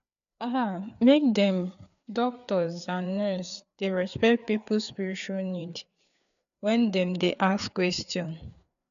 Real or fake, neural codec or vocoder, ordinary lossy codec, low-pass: fake; codec, 16 kHz, 4 kbps, FreqCodec, larger model; none; 7.2 kHz